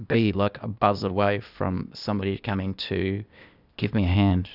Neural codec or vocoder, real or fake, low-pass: codec, 16 kHz, 0.8 kbps, ZipCodec; fake; 5.4 kHz